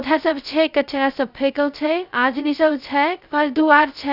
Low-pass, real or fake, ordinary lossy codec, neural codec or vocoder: 5.4 kHz; fake; none; codec, 16 kHz, 0.2 kbps, FocalCodec